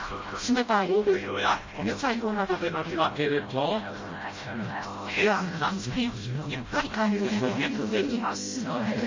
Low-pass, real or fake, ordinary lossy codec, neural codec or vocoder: 7.2 kHz; fake; MP3, 32 kbps; codec, 16 kHz, 0.5 kbps, FreqCodec, smaller model